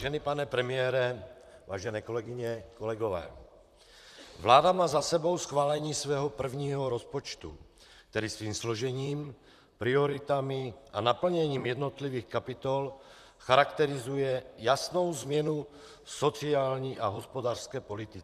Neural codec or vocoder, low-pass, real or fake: vocoder, 44.1 kHz, 128 mel bands, Pupu-Vocoder; 14.4 kHz; fake